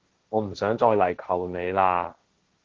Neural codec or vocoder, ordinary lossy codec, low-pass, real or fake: codec, 16 kHz, 1.1 kbps, Voila-Tokenizer; Opus, 16 kbps; 7.2 kHz; fake